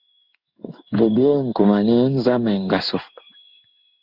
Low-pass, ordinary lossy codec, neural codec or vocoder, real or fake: 5.4 kHz; Opus, 64 kbps; codec, 16 kHz in and 24 kHz out, 1 kbps, XY-Tokenizer; fake